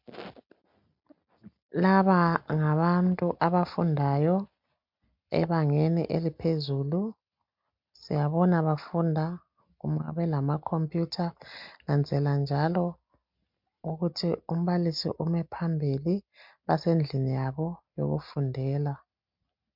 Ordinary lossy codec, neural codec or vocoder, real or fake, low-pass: MP3, 48 kbps; none; real; 5.4 kHz